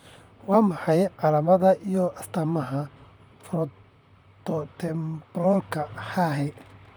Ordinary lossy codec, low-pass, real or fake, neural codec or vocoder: none; none; fake; vocoder, 44.1 kHz, 128 mel bands, Pupu-Vocoder